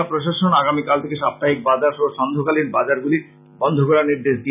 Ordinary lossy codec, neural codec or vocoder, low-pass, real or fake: none; none; 3.6 kHz; real